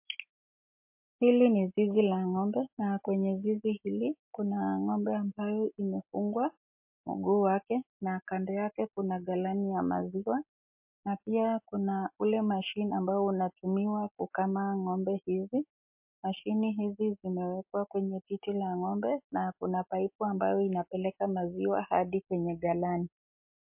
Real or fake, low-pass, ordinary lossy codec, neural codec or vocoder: real; 3.6 kHz; MP3, 32 kbps; none